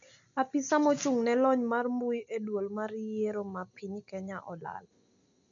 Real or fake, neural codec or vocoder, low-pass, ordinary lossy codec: real; none; 7.2 kHz; none